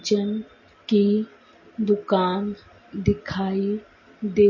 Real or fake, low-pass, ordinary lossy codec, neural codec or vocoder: real; 7.2 kHz; MP3, 32 kbps; none